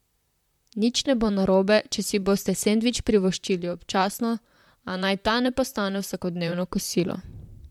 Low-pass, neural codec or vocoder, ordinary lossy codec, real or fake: 19.8 kHz; vocoder, 44.1 kHz, 128 mel bands, Pupu-Vocoder; MP3, 96 kbps; fake